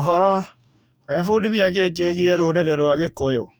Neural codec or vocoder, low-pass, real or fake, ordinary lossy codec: codec, 44.1 kHz, 2.6 kbps, DAC; none; fake; none